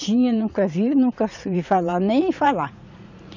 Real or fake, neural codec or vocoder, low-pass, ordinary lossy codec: fake; vocoder, 44.1 kHz, 80 mel bands, Vocos; 7.2 kHz; MP3, 64 kbps